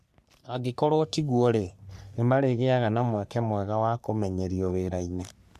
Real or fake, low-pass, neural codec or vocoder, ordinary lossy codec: fake; 14.4 kHz; codec, 44.1 kHz, 3.4 kbps, Pupu-Codec; MP3, 96 kbps